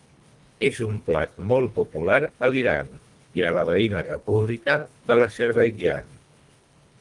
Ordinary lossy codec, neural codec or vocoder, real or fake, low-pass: Opus, 32 kbps; codec, 24 kHz, 1.5 kbps, HILCodec; fake; 10.8 kHz